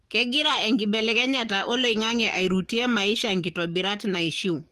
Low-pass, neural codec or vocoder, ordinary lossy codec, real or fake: 14.4 kHz; codec, 44.1 kHz, 7.8 kbps, DAC; Opus, 24 kbps; fake